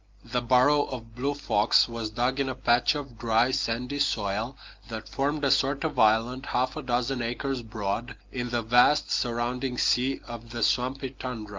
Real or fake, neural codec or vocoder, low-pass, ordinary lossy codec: real; none; 7.2 kHz; Opus, 32 kbps